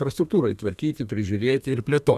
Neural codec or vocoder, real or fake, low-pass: codec, 32 kHz, 1.9 kbps, SNAC; fake; 14.4 kHz